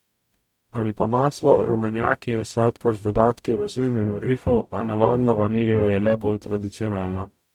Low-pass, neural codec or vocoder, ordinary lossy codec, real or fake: 19.8 kHz; codec, 44.1 kHz, 0.9 kbps, DAC; none; fake